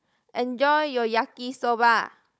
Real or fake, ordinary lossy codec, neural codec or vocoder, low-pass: fake; none; codec, 16 kHz, 16 kbps, FunCodec, trained on Chinese and English, 50 frames a second; none